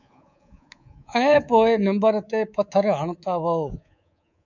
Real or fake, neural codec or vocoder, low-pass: fake; codec, 24 kHz, 3.1 kbps, DualCodec; 7.2 kHz